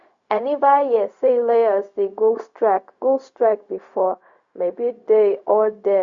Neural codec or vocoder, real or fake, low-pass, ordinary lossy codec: codec, 16 kHz, 0.4 kbps, LongCat-Audio-Codec; fake; 7.2 kHz; Opus, 64 kbps